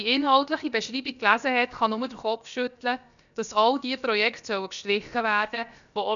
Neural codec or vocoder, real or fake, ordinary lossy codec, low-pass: codec, 16 kHz, about 1 kbps, DyCAST, with the encoder's durations; fake; none; 7.2 kHz